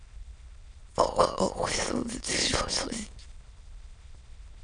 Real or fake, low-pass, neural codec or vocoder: fake; 9.9 kHz; autoencoder, 22.05 kHz, a latent of 192 numbers a frame, VITS, trained on many speakers